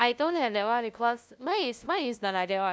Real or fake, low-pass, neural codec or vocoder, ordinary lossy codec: fake; none; codec, 16 kHz, 0.5 kbps, FunCodec, trained on LibriTTS, 25 frames a second; none